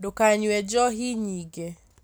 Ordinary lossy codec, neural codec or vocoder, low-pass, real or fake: none; none; none; real